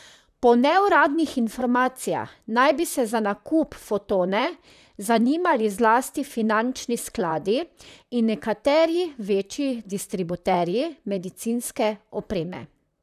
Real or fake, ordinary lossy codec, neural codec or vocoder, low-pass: fake; none; vocoder, 44.1 kHz, 128 mel bands, Pupu-Vocoder; 14.4 kHz